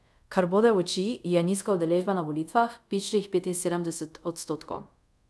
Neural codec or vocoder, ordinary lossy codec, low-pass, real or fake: codec, 24 kHz, 0.5 kbps, DualCodec; none; none; fake